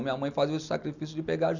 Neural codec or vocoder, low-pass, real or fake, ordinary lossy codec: none; 7.2 kHz; real; none